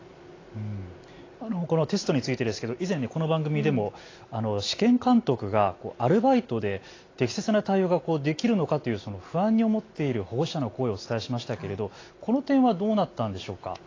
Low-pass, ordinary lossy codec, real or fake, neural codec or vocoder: 7.2 kHz; AAC, 32 kbps; real; none